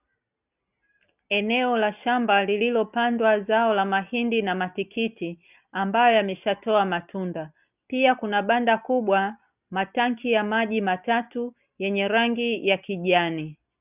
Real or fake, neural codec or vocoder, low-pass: real; none; 3.6 kHz